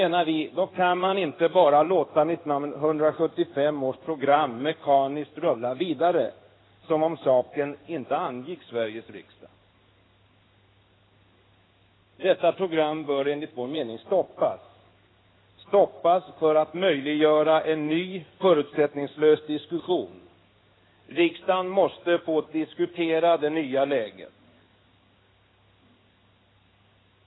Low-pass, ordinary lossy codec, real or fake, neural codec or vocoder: 7.2 kHz; AAC, 16 kbps; fake; codec, 16 kHz in and 24 kHz out, 1 kbps, XY-Tokenizer